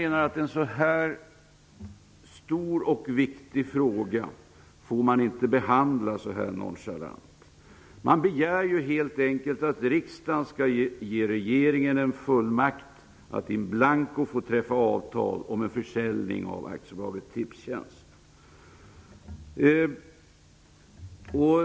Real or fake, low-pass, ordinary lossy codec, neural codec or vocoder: real; none; none; none